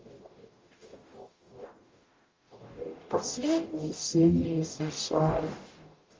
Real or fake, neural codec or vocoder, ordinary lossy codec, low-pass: fake; codec, 44.1 kHz, 0.9 kbps, DAC; Opus, 32 kbps; 7.2 kHz